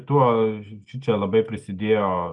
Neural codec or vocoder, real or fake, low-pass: none; real; 10.8 kHz